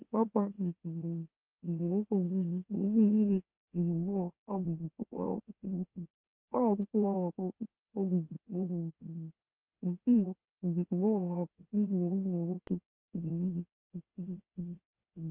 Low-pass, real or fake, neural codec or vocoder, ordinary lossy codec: 3.6 kHz; fake; autoencoder, 44.1 kHz, a latent of 192 numbers a frame, MeloTTS; Opus, 24 kbps